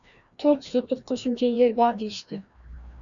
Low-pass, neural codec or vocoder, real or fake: 7.2 kHz; codec, 16 kHz, 1 kbps, FreqCodec, larger model; fake